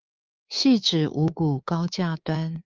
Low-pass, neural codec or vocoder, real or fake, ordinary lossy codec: 7.2 kHz; codec, 24 kHz, 3.1 kbps, DualCodec; fake; Opus, 24 kbps